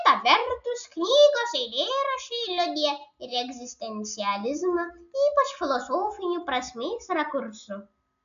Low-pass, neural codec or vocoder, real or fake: 7.2 kHz; none; real